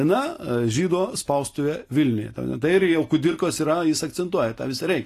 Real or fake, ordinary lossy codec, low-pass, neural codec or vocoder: real; AAC, 48 kbps; 14.4 kHz; none